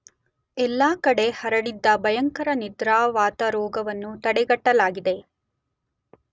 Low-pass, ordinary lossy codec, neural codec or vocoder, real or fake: none; none; none; real